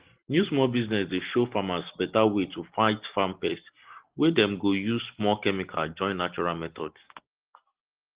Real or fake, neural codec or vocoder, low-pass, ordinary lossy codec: real; none; 3.6 kHz; Opus, 16 kbps